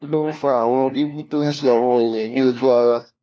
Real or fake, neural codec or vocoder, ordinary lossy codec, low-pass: fake; codec, 16 kHz, 1 kbps, FunCodec, trained on LibriTTS, 50 frames a second; none; none